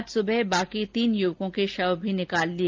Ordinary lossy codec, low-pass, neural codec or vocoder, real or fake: Opus, 32 kbps; 7.2 kHz; none; real